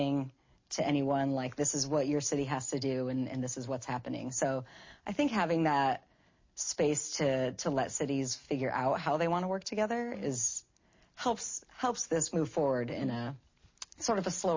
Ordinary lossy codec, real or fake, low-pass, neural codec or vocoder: MP3, 32 kbps; real; 7.2 kHz; none